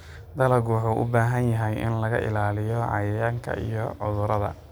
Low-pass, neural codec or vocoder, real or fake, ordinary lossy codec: none; none; real; none